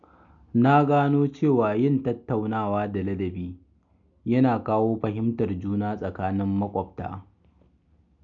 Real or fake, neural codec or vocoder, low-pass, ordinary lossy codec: real; none; 7.2 kHz; none